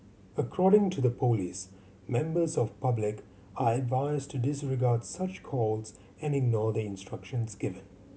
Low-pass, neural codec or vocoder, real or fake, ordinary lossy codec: none; none; real; none